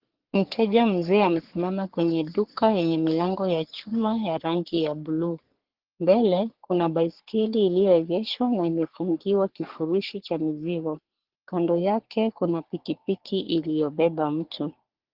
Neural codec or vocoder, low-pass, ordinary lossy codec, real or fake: codec, 44.1 kHz, 3.4 kbps, Pupu-Codec; 5.4 kHz; Opus, 16 kbps; fake